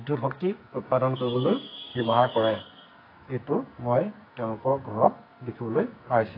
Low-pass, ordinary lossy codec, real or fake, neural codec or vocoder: 5.4 kHz; AAC, 32 kbps; fake; codec, 44.1 kHz, 2.6 kbps, SNAC